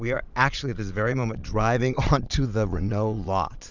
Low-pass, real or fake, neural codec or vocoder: 7.2 kHz; fake; vocoder, 22.05 kHz, 80 mel bands, Vocos